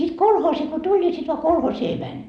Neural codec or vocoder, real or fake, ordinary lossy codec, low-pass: none; real; none; none